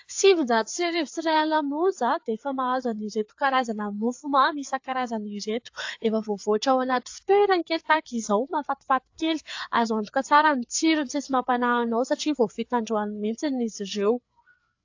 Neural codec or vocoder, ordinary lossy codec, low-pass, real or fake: codec, 16 kHz, 4 kbps, FreqCodec, larger model; AAC, 48 kbps; 7.2 kHz; fake